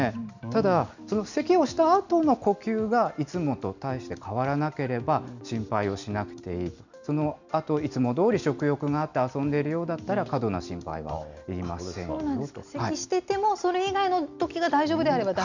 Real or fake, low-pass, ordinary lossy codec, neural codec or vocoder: real; 7.2 kHz; none; none